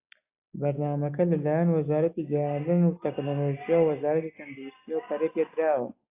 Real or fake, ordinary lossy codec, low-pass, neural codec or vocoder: real; AAC, 24 kbps; 3.6 kHz; none